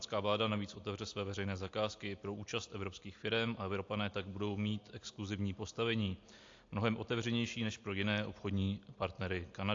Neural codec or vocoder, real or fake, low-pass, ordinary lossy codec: none; real; 7.2 kHz; AAC, 48 kbps